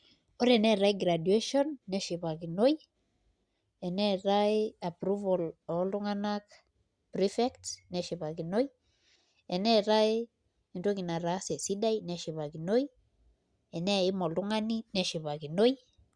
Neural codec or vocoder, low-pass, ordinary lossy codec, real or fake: none; 9.9 kHz; Opus, 64 kbps; real